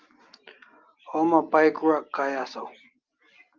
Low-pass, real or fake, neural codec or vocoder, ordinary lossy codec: 7.2 kHz; real; none; Opus, 24 kbps